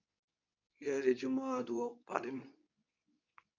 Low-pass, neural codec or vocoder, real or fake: 7.2 kHz; codec, 24 kHz, 0.9 kbps, WavTokenizer, medium speech release version 2; fake